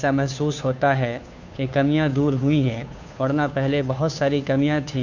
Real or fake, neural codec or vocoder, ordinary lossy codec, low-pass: fake; codec, 16 kHz, 2 kbps, FunCodec, trained on Chinese and English, 25 frames a second; none; 7.2 kHz